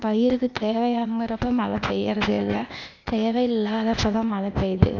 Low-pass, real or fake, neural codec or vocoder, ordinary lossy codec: 7.2 kHz; fake; codec, 16 kHz, 0.8 kbps, ZipCodec; none